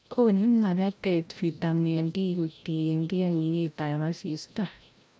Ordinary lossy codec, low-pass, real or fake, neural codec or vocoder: none; none; fake; codec, 16 kHz, 0.5 kbps, FreqCodec, larger model